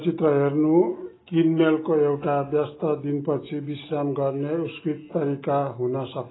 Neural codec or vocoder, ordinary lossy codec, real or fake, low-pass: none; AAC, 16 kbps; real; 7.2 kHz